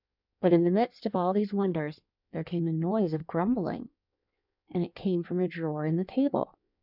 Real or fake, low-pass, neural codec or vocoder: fake; 5.4 kHz; codec, 16 kHz in and 24 kHz out, 1.1 kbps, FireRedTTS-2 codec